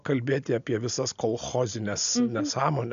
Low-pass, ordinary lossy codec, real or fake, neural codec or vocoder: 7.2 kHz; AAC, 96 kbps; real; none